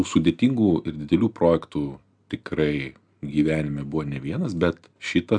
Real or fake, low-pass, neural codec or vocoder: real; 9.9 kHz; none